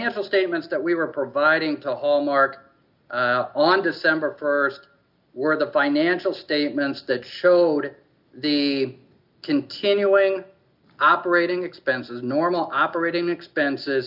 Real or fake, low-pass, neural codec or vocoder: real; 5.4 kHz; none